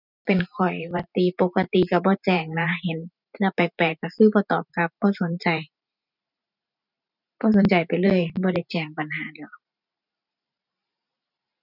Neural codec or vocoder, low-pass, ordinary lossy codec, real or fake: vocoder, 44.1 kHz, 128 mel bands every 512 samples, BigVGAN v2; 5.4 kHz; none; fake